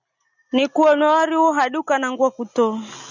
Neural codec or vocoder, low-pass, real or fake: none; 7.2 kHz; real